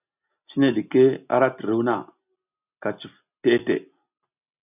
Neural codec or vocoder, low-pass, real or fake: none; 3.6 kHz; real